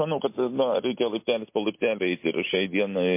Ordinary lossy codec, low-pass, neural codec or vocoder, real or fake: MP3, 24 kbps; 3.6 kHz; none; real